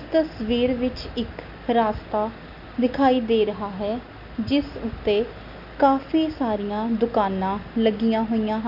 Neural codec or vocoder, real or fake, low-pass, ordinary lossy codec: none; real; 5.4 kHz; none